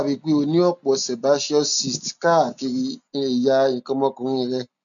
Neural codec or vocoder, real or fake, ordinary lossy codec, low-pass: none; real; none; 7.2 kHz